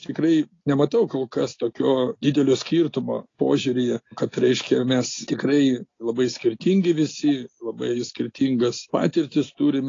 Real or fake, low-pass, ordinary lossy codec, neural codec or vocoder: real; 7.2 kHz; AAC, 32 kbps; none